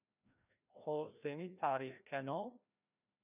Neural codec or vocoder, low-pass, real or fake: codec, 16 kHz, 1 kbps, FreqCodec, larger model; 3.6 kHz; fake